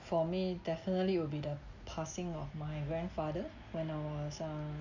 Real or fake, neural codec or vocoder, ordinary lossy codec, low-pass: real; none; none; 7.2 kHz